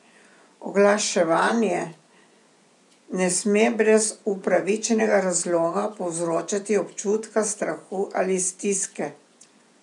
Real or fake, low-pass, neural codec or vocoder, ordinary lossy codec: fake; 10.8 kHz; vocoder, 24 kHz, 100 mel bands, Vocos; none